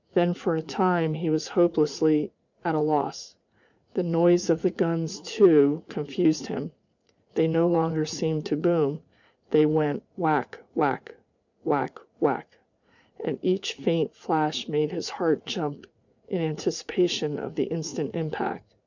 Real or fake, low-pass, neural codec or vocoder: fake; 7.2 kHz; vocoder, 44.1 kHz, 80 mel bands, Vocos